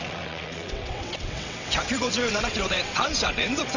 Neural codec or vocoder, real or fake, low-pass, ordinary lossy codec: vocoder, 22.05 kHz, 80 mel bands, WaveNeXt; fake; 7.2 kHz; none